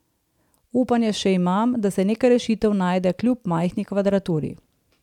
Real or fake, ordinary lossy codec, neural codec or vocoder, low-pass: fake; none; vocoder, 44.1 kHz, 128 mel bands every 512 samples, BigVGAN v2; 19.8 kHz